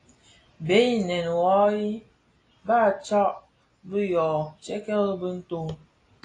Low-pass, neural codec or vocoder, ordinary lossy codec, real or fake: 9.9 kHz; none; AAC, 32 kbps; real